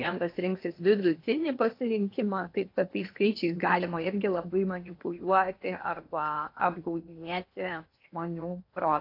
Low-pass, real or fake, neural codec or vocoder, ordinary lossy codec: 5.4 kHz; fake; codec, 16 kHz in and 24 kHz out, 0.8 kbps, FocalCodec, streaming, 65536 codes; AAC, 32 kbps